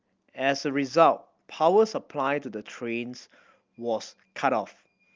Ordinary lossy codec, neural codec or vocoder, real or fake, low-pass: Opus, 32 kbps; none; real; 7.2 kHz